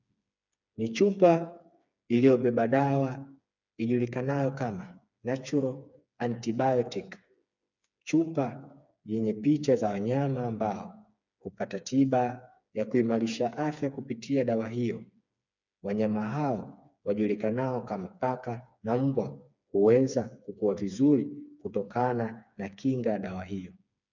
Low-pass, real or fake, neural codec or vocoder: 7.2 kHz; fake; codec, 16 kHz, 4 kbps, FreqCodec, smaller model